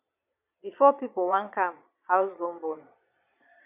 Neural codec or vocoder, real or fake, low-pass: vocoder, 22.05 kHz, 80 mel bands, Vocos; fake; 3.6 kHz